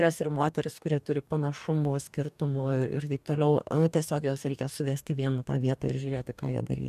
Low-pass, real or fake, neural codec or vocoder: 14.4 kHz; fake; codec, 44.1 kHz, 2.6 kbps, DAC